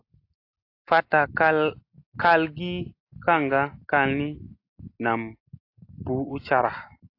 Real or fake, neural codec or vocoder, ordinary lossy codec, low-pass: real; none; AAC, 32 kbps; 5.4 kHz